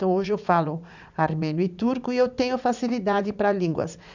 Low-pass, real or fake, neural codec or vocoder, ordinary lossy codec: 7.2 kHz; fake; vocoder, 44.1 kHz, 80 mel bands, Vocos; none